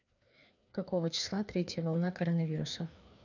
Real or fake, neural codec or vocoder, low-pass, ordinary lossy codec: fake; codec, 16 kHz, 2 kbps, FreqCodec, larger model; 7.2 kHz; none